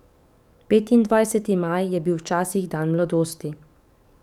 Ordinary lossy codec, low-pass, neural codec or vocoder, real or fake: none; 19.8 kHz; codec, 44.1 kHz, 7.8 kbps, DAC; fake